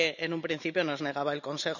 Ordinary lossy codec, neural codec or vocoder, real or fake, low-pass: none; none; real; 7.2 kHz